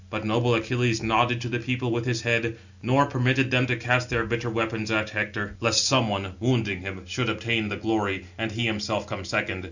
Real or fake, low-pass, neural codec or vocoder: real; 7.2 kHz; none